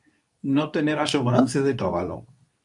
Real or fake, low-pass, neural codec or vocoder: fake; 10.8 kHz; codec, 24 kHz, 0.9 kbps, WavTokenizer, medium speech release version 2